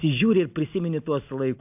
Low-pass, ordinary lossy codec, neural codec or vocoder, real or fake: 3.6 kHz; AAC, 32 kbps; none; real